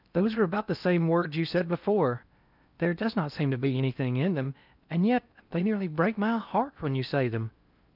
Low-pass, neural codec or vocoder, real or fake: 5.4 kHz; codec, 16 kHz in and 24 kHz out, 0.8 kbps, FocalCodec, streaming, 65536 codes; fake